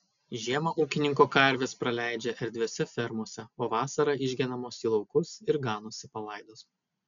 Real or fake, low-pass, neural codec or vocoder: real; 7.2 kHz; none